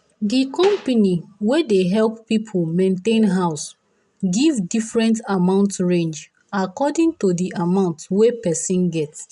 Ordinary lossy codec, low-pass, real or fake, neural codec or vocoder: none; 10.8 kHz; real; none